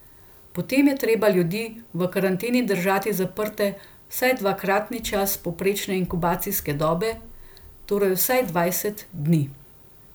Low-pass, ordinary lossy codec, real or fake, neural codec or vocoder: none; none; real; none